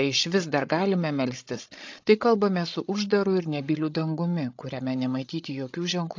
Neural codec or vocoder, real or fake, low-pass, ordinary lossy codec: codec, 16 kHz, 16 kbps, FreqCodec, larger model; fake; 7.2 kHz; AAC, 48 kbps